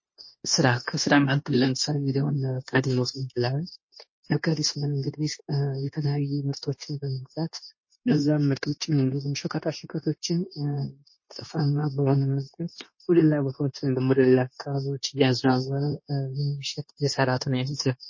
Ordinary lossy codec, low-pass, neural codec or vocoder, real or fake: MP3, 32 kbps; 7.2 kHz; codec, 16 kHz, 0.9 kbps, LongCat-Audio-Codec; fake